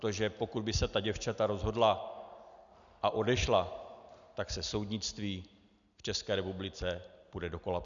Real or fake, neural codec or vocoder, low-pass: real; none; 7.2 kHz